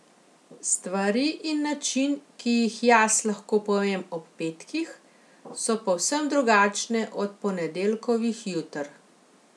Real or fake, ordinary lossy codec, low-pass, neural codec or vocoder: real; none; none; none